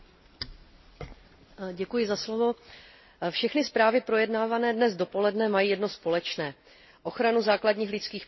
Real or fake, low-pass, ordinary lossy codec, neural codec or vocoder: real; 7.2 kHz; MP3, 24 kbps; none